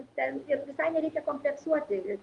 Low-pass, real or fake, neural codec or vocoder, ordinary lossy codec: 10.8 kHz; real; none; Opus, 32 kbps